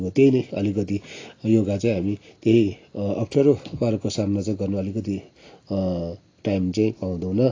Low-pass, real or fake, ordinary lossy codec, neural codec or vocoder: 7.2 kHz; real; AAC, 32 kbps; none